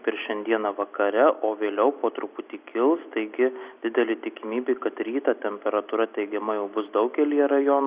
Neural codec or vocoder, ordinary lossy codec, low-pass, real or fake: none; Opus, 64 kbps; 3.6 kHz; real